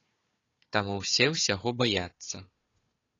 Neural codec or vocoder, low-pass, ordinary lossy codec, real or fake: codec, 16 kHz, 4 kbps, FunCodec, trained on Chinese and English, 50 frames a second; 7.2 kHz; AAC, 32 kbps; fake